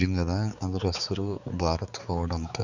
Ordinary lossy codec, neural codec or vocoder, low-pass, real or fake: Opus, 64 kbps; codec, 16 kHz, 4 kbps, X-Codec, HuBERT features, trained on balanced general audio; 7.2 kHz; fake